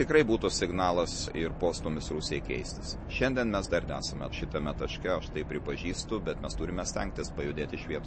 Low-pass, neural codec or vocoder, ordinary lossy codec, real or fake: 9.9 kHz; none; MP3, 32 kbps; real